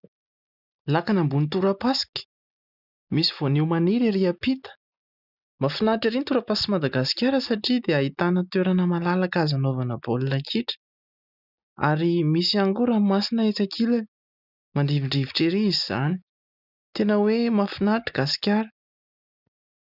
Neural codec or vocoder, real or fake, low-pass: none; real; 5.4 kHz